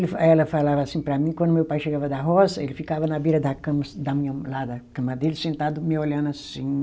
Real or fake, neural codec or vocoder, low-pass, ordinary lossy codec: real; none; none; none